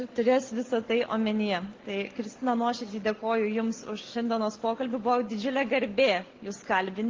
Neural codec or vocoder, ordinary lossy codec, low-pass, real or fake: none; Opus, 16 kbps; 7.2 kHz; real